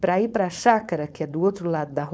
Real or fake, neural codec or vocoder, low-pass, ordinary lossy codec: fake; codec, 16 kHz, 4.8 kbps, FACodec; none; none